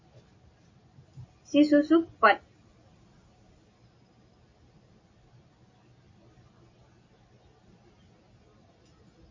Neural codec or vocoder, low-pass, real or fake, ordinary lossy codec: none; 7.2 kHz; real; MP3, 32 kbps